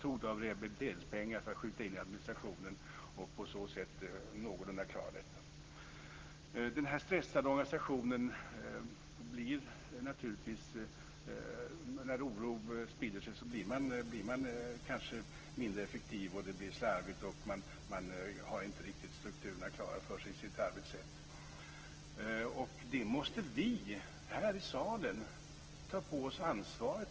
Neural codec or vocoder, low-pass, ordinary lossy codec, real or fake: none; 7.2 kHz; Opus, 16 kbps; real